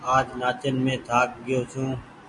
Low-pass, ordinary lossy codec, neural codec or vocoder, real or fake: 10.8 kHz; MP3, 48 kbps; none; real